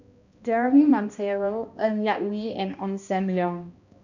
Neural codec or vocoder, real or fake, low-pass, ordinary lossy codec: codec, 16 kHz, 1 kbps, X-Codec, HuBERT features, trained on balanced general audio; fake; 7.2 kHz; none